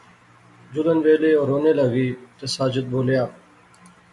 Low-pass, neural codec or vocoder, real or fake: 10.8 kHz; none; real